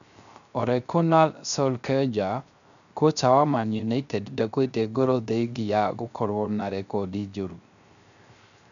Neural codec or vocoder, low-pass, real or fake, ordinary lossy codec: codec, 16 kHz, 0.3 kbps, FocalCodec; 7.2 kHz; fake; none